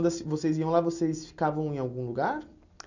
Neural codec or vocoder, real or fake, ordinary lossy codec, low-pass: none; real; none; 7.2 kHz